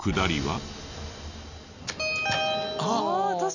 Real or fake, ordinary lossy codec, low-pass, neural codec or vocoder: real; none; 7.2 kHz; none